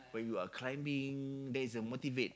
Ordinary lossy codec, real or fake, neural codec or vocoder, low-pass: none; real; none; none